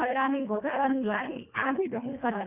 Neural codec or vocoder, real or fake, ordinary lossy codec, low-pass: codec, 24 kHz, 1.5 kbps, HILCodec; fake; none; 3.6 kHz